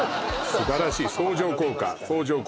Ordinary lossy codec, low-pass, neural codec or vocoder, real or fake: none; none; none; real